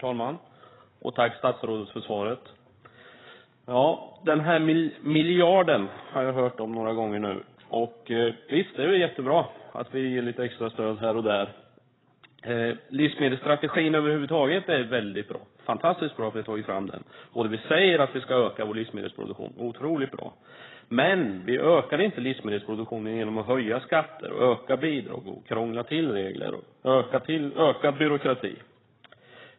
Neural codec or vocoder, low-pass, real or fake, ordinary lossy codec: codec, 16 kHz, 8 kbps, FreqCodec, larger model; 7.2 kHz; fake; AAC, 16 kbps